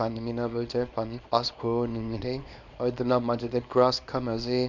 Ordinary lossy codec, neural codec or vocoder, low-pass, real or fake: none; codec, 24 kHz, 0.9 kbps, WavTokenizer, small release; 7.2 kHz; fake